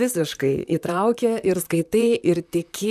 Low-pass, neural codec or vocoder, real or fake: 14.4 kHz; vocoder, 44.1 kHz, 128 mel bands, Pupu-Vocoder; fake